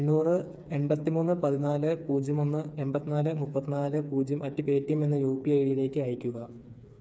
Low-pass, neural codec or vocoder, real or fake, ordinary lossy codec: none; codec, 16 kHz, 4 kbps, FreqCodec, smaller model; fake; none